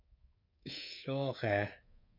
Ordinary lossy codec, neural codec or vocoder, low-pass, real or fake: MP3, 32 kbps; codec, 24 kHz, 3.1 kbps, DualCodec; 5.4 kHz; fake